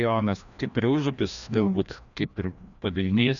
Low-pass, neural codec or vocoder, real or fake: 7.2 kHz; codec, 16 kHz, 1 kbps, FreqCodec, larger model; fake